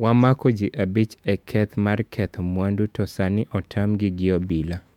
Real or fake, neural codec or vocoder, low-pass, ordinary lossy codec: fake; autoencoder, 48 kHz, 128 numbers a frame, DAC-VAE, trained on Japanese speech; 19.8 kHz; MP3, 96 kbps